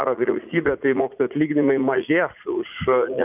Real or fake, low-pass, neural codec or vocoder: fake; 3.6 kHz; vocoder, 44.1 kHz, 80 mel bands, Vocos